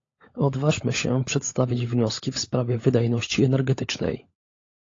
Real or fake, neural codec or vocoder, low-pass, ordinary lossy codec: fake; codec, 16 kHz, 16 kbps, FunCodec, trained on LibriTTS, 50 frames a second; 7.2 kHz; AAC, 32 kbps